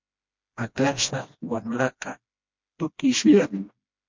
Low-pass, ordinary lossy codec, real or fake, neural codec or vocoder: 7.2 kHz; MP3, 48 kbps; fake; codec, 16 kHz, 1 kbps, FreqCodec, smaller model